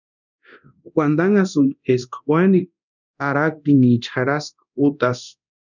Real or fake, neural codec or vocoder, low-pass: fake; codec, 24 kHz, 0.9 kbps, DualCodec; 7.2 kHz